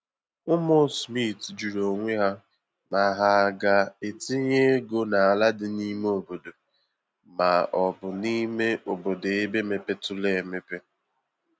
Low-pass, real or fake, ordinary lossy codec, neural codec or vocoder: none; real; none; none